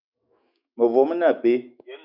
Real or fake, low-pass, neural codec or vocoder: fake; 5.4 kHz; autoencoder, 48 kHz, 128 numbers a frame, DAC-VAE, trained on Japanese speech